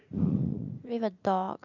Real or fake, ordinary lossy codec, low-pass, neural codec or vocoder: fake; none; 7.2 kHz; vocoder, 44.1 kHz, 80 mel bands, Vocos